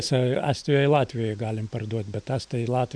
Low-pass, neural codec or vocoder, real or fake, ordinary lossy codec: 9.9 kHz; none; real; MP3, 96 kbps